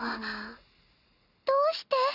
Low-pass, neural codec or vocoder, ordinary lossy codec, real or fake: 5.4 kHz; none; none; real